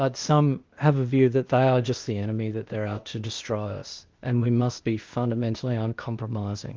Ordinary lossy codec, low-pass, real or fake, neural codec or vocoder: Opus, 24 kbps; 7.2 kHz; fake; codec, 16 kHz, 0.8 kbps, ZipCodec